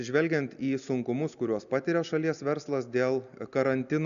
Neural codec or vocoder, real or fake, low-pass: none; real; 7.2 kHz